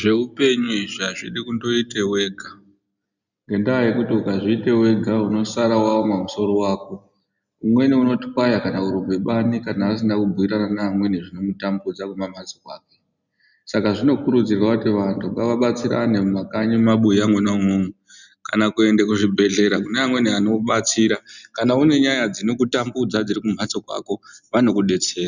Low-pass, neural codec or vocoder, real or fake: 7.2 kHz; none; real